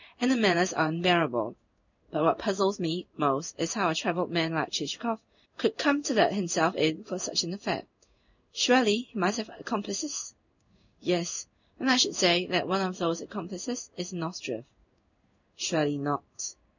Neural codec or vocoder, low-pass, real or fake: codec, 16 kHz in and 24 kHz out, 1 kbps, XY-Tokenizer; 7.2 kHz; fake